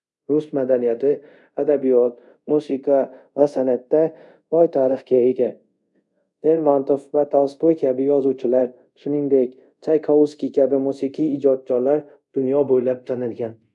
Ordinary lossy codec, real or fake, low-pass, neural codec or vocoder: none; fake; 10.8 kHz; codec, 24 kHz, 0.5 kbps, DualCodec